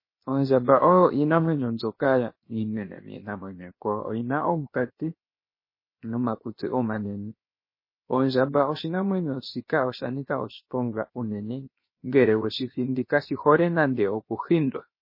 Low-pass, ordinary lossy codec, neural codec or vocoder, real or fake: 5.4 kHz; MP3, 24 kbps; codec, 16 kHz, 0.7 kbps, FocalCodec; fake